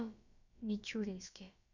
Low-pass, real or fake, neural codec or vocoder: 7.2 kHz; fake; codec, 16 kHz, about 1 kbps, DyCAST, with the encoder's durations